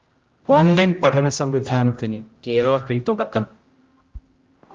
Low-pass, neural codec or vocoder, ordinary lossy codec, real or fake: 7.2 kHz; codec, 16 kHz, 0.5 kbps, X-Codec, HuBERT features, trained on general audio; Opus, 32 kbps; fake